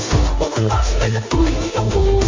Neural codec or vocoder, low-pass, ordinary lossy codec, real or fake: codec, 16 kHz in and 24 kHz out, 0.9 kbps, LongCat-Audio-Codec, four codebook decoder; 7.2 kHz; MP3, 48 kbps; fake